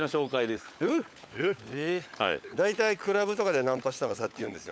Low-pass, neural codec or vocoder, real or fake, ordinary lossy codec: none; codec, 16 kHz, 16 kbps, FunCodec, trained on LibriTTS, 50 frames a second; fake; none